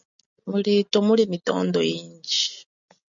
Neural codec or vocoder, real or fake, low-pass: none; real; 7.2 kHz